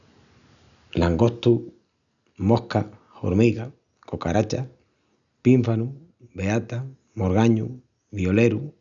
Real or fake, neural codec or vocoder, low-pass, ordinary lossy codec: real; none; 7.2 kHz; none